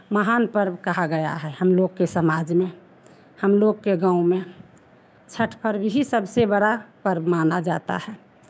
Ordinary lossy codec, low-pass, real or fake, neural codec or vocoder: none; none; fake; codec, 16 kHz, 6 kbps, DAC